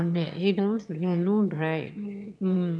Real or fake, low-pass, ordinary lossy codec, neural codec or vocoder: fake; none; none; autoencoder, 22.05 kHz, a latent of 192 numbers a frame, VITS, trained on one speaker